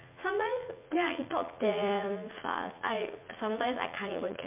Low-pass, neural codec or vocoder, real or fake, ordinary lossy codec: 3.6 kHz; vocoder, 44.1 kHz, 80 mel bands, Vocos; fake; MP3, 32 kbps